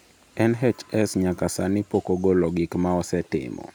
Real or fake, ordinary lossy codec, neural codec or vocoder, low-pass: real; none; none; none